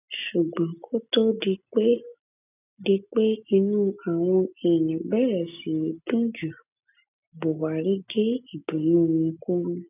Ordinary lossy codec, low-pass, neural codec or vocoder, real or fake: none; 3.6 kHz; none; real